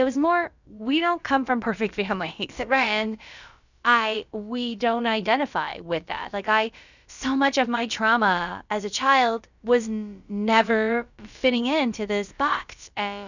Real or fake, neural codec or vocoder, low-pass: fake; codec, 16 kHz, about 1 kbps, DyCAST, with the encoder's durations; 7.2 kHz